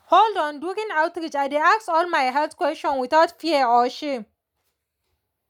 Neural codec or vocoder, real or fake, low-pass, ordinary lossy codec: none; real; 19.8 kHz; none